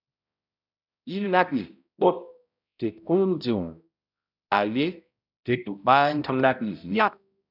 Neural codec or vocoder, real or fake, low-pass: codec, 16 kHz, 0.5 kbps, X-Codec, HuBERT features, trained on balanced general audio; fake; 5.4 kHz